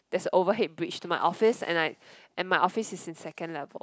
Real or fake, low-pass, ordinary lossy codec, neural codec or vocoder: real; none; none; none